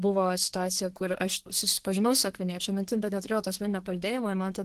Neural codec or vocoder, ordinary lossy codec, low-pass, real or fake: codec, 32 kHz, 1.9 kbps, SNAC; Opus, 16 kbps; 14.4 kHz; fake